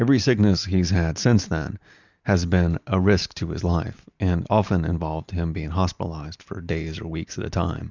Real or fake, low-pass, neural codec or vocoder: real; 7.2 kHz; none